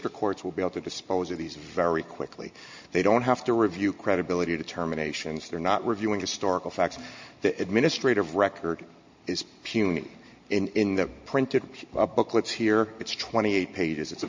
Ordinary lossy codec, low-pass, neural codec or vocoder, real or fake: MP3, 48 kbps; 7.2 kHz; none; real